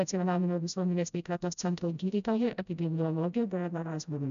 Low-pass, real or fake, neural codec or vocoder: 7.2 kHz; fake; codec, 16 kHz, 0.5 kbps, FreqCodec, smaller model